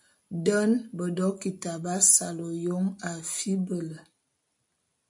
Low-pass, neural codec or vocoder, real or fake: 10.8 kHz; none; real